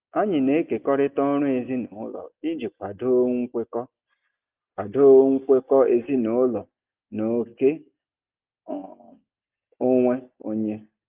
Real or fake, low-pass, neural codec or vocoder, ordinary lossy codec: real; 3.6 kHz; none; Opus, 24 kbps